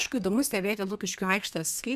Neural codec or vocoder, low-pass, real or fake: codec, 32 kHz, 1.9 kbps, SNAC; 14.4 kHz; fake